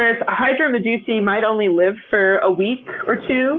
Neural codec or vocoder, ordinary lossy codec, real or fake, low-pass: codec, 44.1 kHz, 7.8 kbps, Pupu-Codec; Opus, 24 kbps; fake; 7.2 kHz